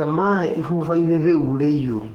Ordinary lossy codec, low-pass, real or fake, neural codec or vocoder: Opus, 16 kbps; 19.8 kHz; fake; codec, 44.1 kHz, 2.6 kbps, DAC